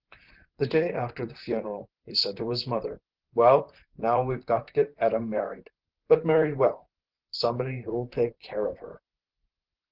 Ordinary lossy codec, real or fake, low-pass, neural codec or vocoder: Opus, 16 kbps; fake; 5.4 kHz; vocoder, 44.1 kHz, 128 mel bands, Pupu-Vocoder